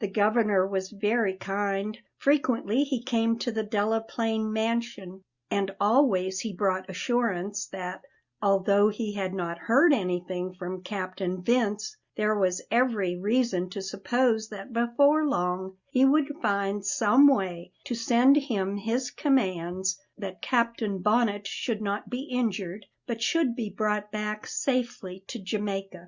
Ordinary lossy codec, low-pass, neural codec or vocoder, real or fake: Opus, 64 kbps; 7.2 kHz; none; real